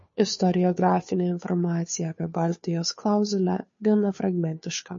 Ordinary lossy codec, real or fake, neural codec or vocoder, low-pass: MP3, 32 kbps; fake; codec, 16 kHz, 2 kbps, FunCodec, trained on Chinese and English, 25 frames a second; 7.2 kHz